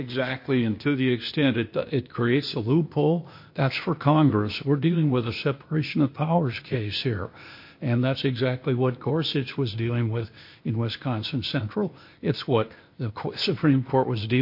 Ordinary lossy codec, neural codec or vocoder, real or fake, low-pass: MP3, 32 kbps; codec, 16 kHz, 0.8 kbps, ZipCodec; fake; 5.4 kHz